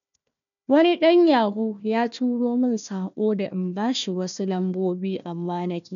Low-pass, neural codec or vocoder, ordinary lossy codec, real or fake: 7.2 kHz; codec, 16 kHz, 1 kbps, FunCodec, trained on Chinese and English, 50 frames a second; none; fake